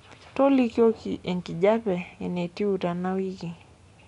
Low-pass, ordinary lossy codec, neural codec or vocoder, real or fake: 10.8 kHz; none; none; real